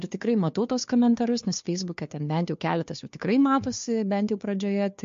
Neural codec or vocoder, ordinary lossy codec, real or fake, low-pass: codec, 16 kHz, 2 kbps, FunCodec, trained on Chinese and English, 25 frames a second; MP3, 48 kbps; fake; 7.2 kHz